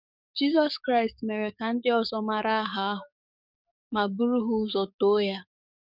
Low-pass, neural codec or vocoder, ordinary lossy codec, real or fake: 5.4 kHz; codec, 44.1 kHz, 7.8 kbps, DAC; none; fake